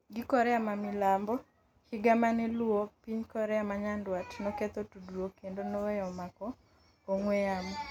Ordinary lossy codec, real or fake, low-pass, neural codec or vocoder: none; real; 19.8 kHz; none